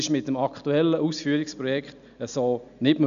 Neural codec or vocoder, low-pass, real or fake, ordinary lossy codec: none; 7.2 kHz; real; none